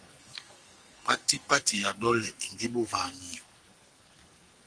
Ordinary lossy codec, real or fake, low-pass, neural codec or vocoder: Opus, 24 kbps; fake; 9.9 kHz; codec, 44.1 kHz, 2.6 kbps, SNAC